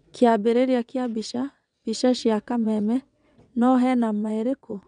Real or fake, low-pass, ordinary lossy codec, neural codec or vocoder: fake; 9.9 kHz; none; vocoder, 22.05 kHz, 80 mel bands, WaveNeXt